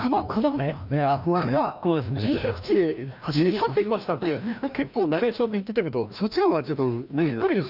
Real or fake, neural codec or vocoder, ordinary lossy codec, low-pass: fake; codec, 16 kHz, 1 kbps, FreqCodec, larger model; none; 5.4 kHz